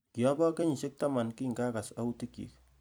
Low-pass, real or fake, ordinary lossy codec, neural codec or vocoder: none; fake; none; vocoder, 44.1 kHz, 128 mel bands every 256 samples, BigVGAN v2